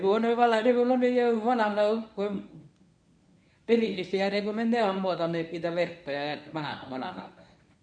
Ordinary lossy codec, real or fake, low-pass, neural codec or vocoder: none; fake; 9.9 kHz; codec, 24 kHz, 0.9 kbps, WavTokenizer, medium speech release version 1